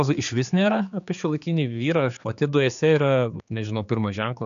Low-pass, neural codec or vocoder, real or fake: 7.2 kHz; codec, 16 kHz, 4 kbps, X-Codec, HuBERT features, trained on general audio; fake